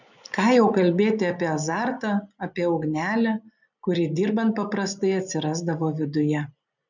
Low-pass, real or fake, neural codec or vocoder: 7.2 kHz; real; none